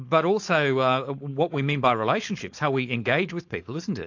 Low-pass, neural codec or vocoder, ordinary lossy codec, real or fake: 7.2 kHz; codec, 16 kHz, 4.8 kbps, FACodec; AAC, 48 kbps; fake